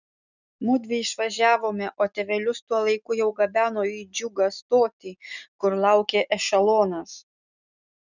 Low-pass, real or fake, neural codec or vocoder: 7.2 kHz; real; none